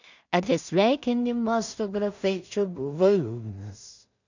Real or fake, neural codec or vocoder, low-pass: fake; codec, 16 kHz in and 24 kHz out, 0.4 kbps, LongCat-Audio-Codec, two codebook decoder; 7.2 kHz